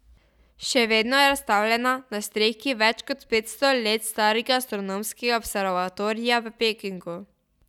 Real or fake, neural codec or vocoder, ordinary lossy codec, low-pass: real; none; none; 19.8 kHz